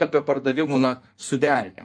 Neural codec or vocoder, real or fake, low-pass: codec, 16 kHz in and 24 kHz out, 1.1 kbps, FireRedTTS-2 codec; fake; 9.9 kHz